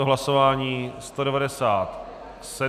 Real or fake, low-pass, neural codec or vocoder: real; 14.4 kHz; none